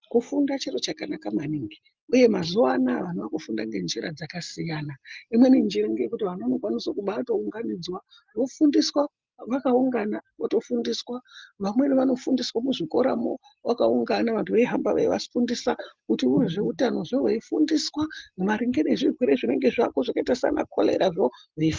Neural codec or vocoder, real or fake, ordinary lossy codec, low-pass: none; real; Opus, 32 kbps; 7.2 kHz